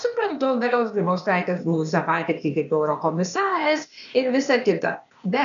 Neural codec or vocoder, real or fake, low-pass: codec, 16 kHz, 0.8 kbps, ZipCodec; fake; 7.2 kHz